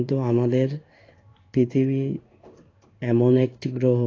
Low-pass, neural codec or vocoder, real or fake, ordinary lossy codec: 7.2 kHz; codec, 16 kHz in and 24 kHz out, 1 kbps, XY-Tokenizer; fake; AAC, 32 kbps